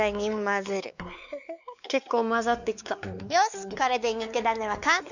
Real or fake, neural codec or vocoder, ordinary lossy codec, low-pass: fake; codec, 16 kHz, 4 kbps, X-Codec, WavLM features, trained on Multilingual LibriSpeech; none; 7.2 kHz